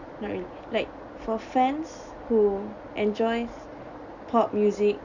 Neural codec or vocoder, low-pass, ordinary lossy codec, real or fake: none; 7.2 kHz; none; real